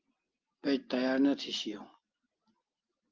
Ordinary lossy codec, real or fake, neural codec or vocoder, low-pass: Opus, 32 kbps; real; none; 7.2 kHz